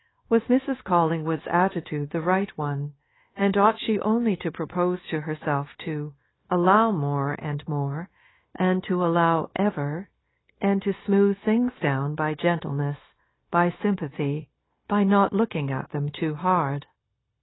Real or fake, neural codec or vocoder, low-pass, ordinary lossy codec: fake; codec, 16 kHz, 0.9 kbps, LongCat-Audio-Codec; 7.2 kHz; AAC, 16 kbps